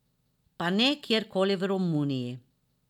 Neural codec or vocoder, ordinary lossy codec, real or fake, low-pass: none; none; real; 19.8 kHz